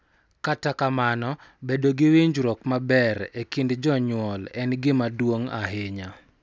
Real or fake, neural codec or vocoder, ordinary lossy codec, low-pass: real; none; none; none